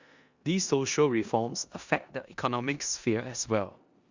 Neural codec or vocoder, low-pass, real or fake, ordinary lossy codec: codec, 16 kHz in and 24 kHz out, 0.9 kbps, LongCat-Audio-Codec, four codebook decoder; 7.2 kHz; fake; Opus, 64 kbps